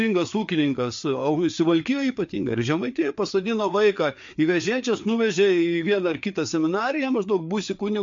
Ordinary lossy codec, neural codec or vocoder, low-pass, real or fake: MP3, 48 kbps; codec, 16 kHz, 4 kbps, FreqCodec, larger model; 7.2 kHz; fake